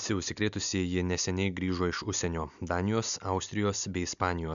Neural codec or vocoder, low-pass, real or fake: none; 7.2 kHz; real